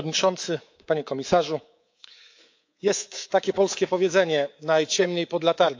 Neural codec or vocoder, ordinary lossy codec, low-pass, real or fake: codec, 24 kHz, 3.1 kbps, DualCodec; AAC, 48 kbps; 7.2 kHz; fake